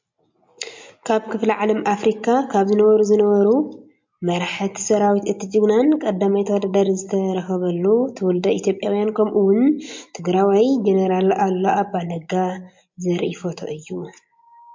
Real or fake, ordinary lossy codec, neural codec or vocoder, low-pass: real; MP3, 48 kbps; none; 7.2 kHz